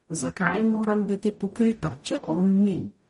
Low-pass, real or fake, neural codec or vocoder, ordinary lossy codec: 19.8 kHz; fake; codec, 44.1 kHz, 0.9 kbps, DAC; MP3, 48 kbps